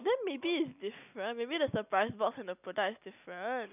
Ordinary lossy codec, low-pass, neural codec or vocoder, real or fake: none; 3.6 kHz; none; real